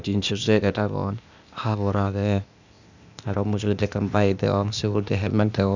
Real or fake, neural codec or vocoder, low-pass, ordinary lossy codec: fake; codec, 16 kHz, 0.8 kbps, ZipCodec; 7.2 kHz; none